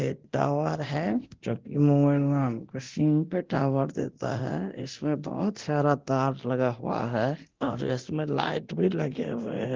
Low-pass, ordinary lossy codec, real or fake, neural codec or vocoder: 7.2 kHz; Opus, 16 kbps; fake; codec, 24 kHz, 0.9 kbps, DualCodec